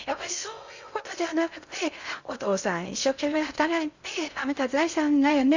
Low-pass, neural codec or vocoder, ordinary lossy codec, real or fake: 7.2 kHz; codec, 16 kHz in and 24 kHz out, 0.6 kbps, FocalCodec, streaming, 2048 codes; Opus, 64 kbps; fake